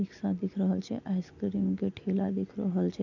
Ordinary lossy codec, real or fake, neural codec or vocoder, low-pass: none; real; none; 7.2 kHz